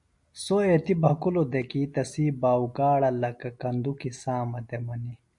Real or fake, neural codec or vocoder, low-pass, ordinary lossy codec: real; none; 10.8 kHz; MP3, 64 kbps